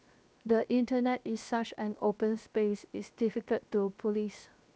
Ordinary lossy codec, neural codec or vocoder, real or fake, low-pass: none; codec, 16 kHz, 0.7 kbps, FocalCodec; fake; none